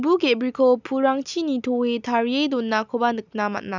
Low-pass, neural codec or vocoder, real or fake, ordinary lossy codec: 7.2 kHz; none; real; none